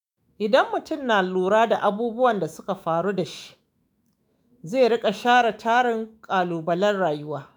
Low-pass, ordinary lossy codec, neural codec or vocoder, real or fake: none; none; autoencoder, 48 kHz, 128 numbers a frame, DAC-VAE, trained on Japanese speech; fake